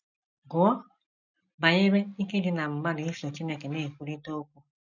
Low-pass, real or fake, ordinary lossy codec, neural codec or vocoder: 7.2 kHz; real; none; none